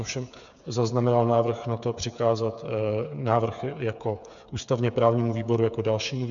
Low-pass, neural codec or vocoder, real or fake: 7.2 kHz; codec, 16 kHz, 8 kbps, FreqCodec, smaller model; fake